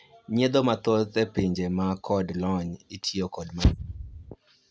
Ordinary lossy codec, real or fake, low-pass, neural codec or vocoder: none; real; none; none